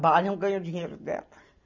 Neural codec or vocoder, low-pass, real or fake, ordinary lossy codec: none; 7.2 kHz; real; none